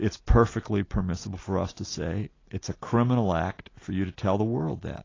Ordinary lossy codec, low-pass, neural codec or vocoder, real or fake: AAC, 32 kbps; 7.2 kHz; none; real